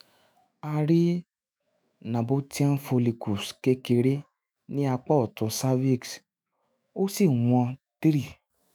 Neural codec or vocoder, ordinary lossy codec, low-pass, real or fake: autoencoder, 48 kHz, 128 numbers a frame, DAC-VAE, trained on Japanese speech; none; none; fake